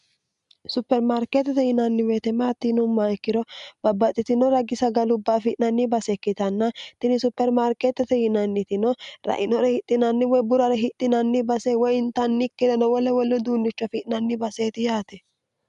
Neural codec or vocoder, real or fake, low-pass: none; real; 10.8 kHz